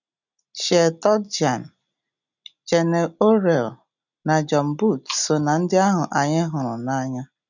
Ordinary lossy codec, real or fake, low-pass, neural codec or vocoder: none; real; 7.2 kHz; none